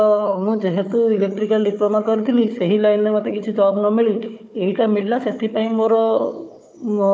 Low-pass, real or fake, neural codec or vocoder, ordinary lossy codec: none; fake; codec, 16 kHz, 4 kbps, FunCodec, trained on Chinese and English, 50 frames a second; none